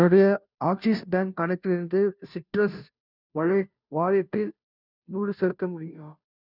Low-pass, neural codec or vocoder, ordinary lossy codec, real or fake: 5.4 kHz; codec, 16 kHz, 0.5 kbps, FunCodec, trained on Chinese and English, 25 frames a second; none; fake